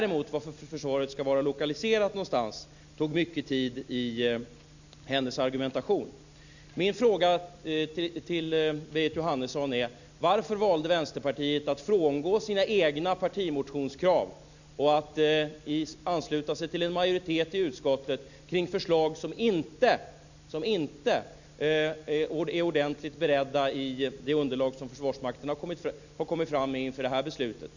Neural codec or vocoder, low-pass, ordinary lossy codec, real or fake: none; 7.2 kHz; none; real